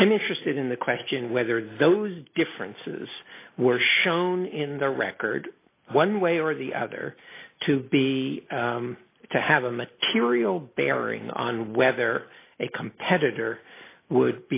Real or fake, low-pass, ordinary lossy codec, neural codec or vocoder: real; 3.6 kHz; AAC, 24 kbps; none